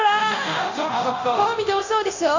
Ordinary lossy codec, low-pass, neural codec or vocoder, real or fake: AAC, 48 kbps; 7.2 kHz; codec, 24 kHz, 0.9 kbps, DualCodec; fake